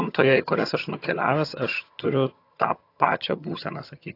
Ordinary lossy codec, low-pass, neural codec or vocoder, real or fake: AAC, 32 kbps; 5.4 kHz; vocoder, 22.05 kHz, 80 mel bands, HiFi-GAN; fake